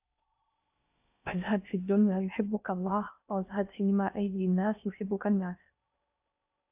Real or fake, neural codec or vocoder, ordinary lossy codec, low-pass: fake; codec, 16 kHz in and 24 kHz out, 0.6 kbps, FocalCodec, streaming, 4096 codes; AAC, 32 kbps; 3.6 kHz